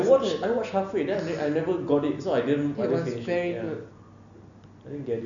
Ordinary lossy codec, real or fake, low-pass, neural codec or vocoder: none; real; 7.2 kHz; none